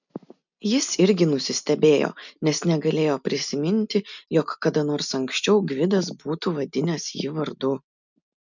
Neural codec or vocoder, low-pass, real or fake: none; 7.2 kHz; real